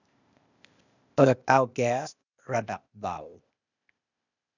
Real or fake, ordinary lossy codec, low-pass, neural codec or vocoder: fake; none; 7.2 kHz; codec, 16 kHz, 0.8 kbps, ZipCodec